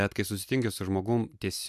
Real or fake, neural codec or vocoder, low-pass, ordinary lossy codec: real; none; 14.4 kHz; MP3, 96 kbps